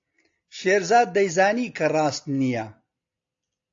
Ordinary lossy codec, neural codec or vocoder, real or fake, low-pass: AAC, 48 kbps; none; real; 7.2 kHz